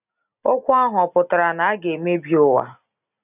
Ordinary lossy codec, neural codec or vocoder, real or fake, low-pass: none; none; real; 3.6 kHz